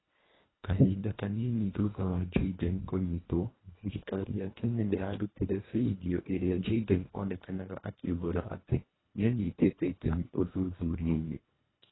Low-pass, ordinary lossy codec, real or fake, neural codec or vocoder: 7.2 kHz; AAC, 16 kbps; fake; codec, 24 kHz, 1.5 kbps, HILCodec